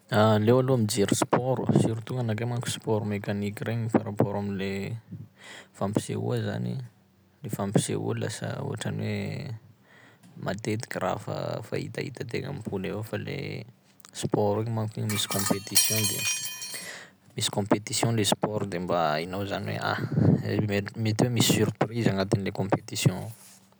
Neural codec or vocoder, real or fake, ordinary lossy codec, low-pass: none; real; none; none